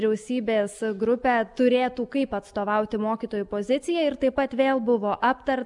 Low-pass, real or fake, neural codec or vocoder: 10.8 kHz; real; none